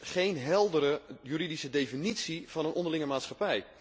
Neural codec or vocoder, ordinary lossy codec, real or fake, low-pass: none; none; real; none